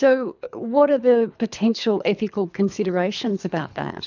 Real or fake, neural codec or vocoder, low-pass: fake; codec, 24 kHz, 3 kbps, HILCodec; 7.2 kHz